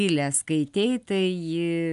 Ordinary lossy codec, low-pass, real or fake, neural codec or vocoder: MP3, 96 kbps; 10.8 kHz; real; none